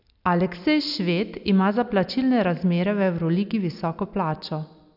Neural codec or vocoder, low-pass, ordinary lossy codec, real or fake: none; 5.4 kHz; none; real